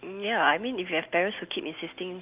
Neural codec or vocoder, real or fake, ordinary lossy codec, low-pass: none; real; Opus, 24 kbps; 3.6 kHz